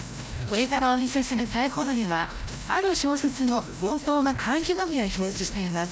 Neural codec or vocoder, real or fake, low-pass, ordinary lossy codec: codec, 16 kHz, 0.5 kbps, FreqCodec, larger model; fake; none; none